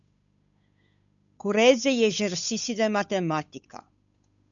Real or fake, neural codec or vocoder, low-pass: fake; codec, 16 kHz, 8 kbps, FunCodec, trained on Chinese and English, 25 frames a second; 7.2 kHz